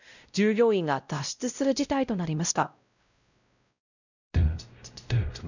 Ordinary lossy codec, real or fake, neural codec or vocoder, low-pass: none; fake; codec, 16 kHz, 0.5 kbps, X-Codec, WavLM features, trained on Multilingual LibriSpeech; 7.2 kHz